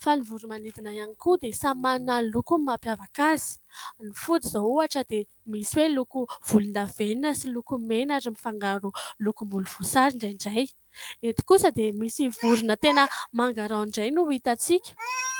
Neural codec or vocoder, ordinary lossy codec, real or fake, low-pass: autoencoder, 48 kHz, 128 numbers a frame, DAC-VAE, trained on Japanese speech; Opus, 32 kbps; fake; 19.8 kHz